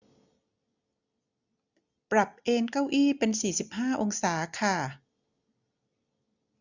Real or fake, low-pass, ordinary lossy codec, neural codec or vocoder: real; 7.2 kHz; none; none